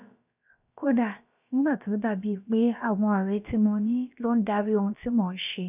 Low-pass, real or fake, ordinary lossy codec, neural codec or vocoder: 3.6 kHz; fake; none; codec, 16 kHz, about 1 kbps, DyCAST, with the encoder's durations